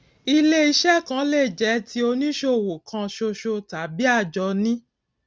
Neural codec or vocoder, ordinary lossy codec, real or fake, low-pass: none; none; real; none